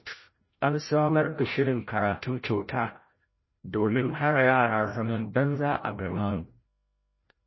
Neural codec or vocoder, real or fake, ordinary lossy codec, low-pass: codec, 16 kHz, 0.5 kbps, FreqCodec, larger model; fake; MP3, 24 kbps; 7.2 kHz